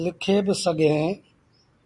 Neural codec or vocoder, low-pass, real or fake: none; 10.8 kHz; real